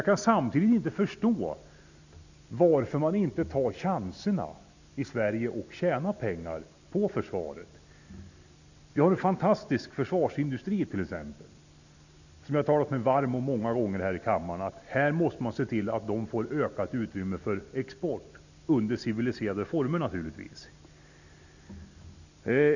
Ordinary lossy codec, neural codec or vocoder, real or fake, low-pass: none; none; real; 7.2 kHz